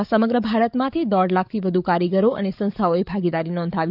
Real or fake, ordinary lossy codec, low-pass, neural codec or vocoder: fake; none; 5.4 kHz; codec, 44.1 kHz, 7.8 kbps, DAC